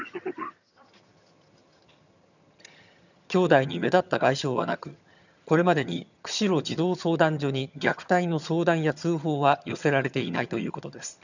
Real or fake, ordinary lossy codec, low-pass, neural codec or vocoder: fake; none; 7.2 kHz; vocoder, 22.05 kHz, 80 mel bands, HiFi-GAN